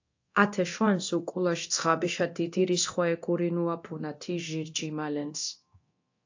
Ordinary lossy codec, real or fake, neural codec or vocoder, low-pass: AAC, 48 kbps; fake; codec, 24 kHz, 0.9 kbps, DualCodec; 7.2 kHz